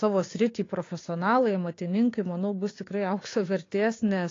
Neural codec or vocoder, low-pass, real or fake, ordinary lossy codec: codec, 16 kHz, 6 kbps, DAC; 7.2 kHz; fake; AAC, 32 kbps